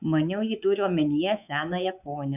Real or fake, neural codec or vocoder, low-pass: fake; codec, 24 kHz, 3.1 kbps, DualCodec; 3.6 kHz